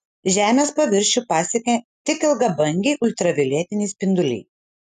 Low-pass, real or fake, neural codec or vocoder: 14.4 kHz; real; none